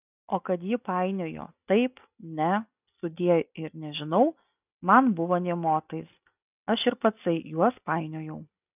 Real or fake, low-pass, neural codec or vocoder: real; 3.6 kHz; none